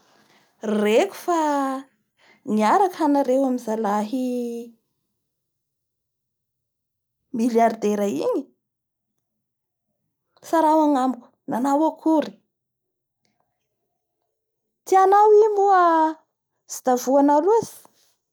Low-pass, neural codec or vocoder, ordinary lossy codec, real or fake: none; none; none; real